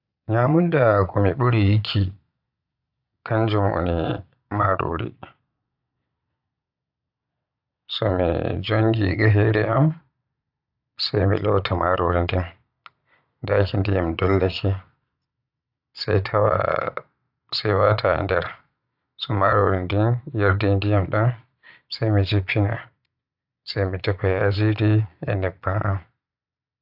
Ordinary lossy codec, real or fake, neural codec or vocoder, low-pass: AAC, 48 kbps; fake; vocoder, 22.05 kHz, 80 mel bands, Vocos; 5.4 kHz